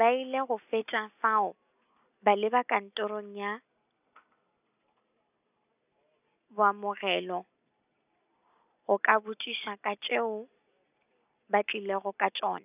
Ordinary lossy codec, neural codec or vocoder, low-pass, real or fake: none; none; 3.6 kHz; real